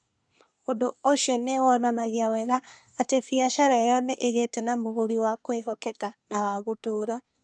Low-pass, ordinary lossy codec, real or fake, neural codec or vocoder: 9.9 kHz; none; fake; codec, 24 kHz, 1 kbps, SNAC